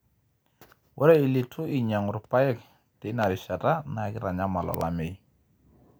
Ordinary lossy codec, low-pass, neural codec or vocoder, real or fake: none; none; none; real